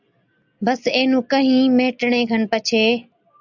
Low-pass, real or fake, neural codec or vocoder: 7.2 kHz; real; none